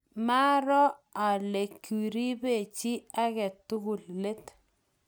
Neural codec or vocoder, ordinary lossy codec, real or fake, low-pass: none; none; real; none